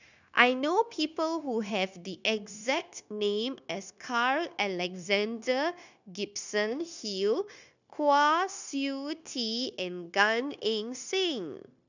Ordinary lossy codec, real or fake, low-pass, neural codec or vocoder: none; fake; 7.2 kHz; codec, 16 kHz, 0.9 kbps, LongCat-Audio-Codec